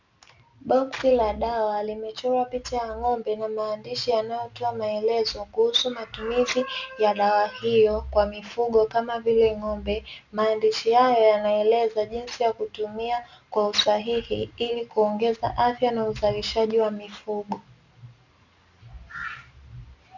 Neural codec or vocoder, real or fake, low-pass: none; real; 7.2 kHz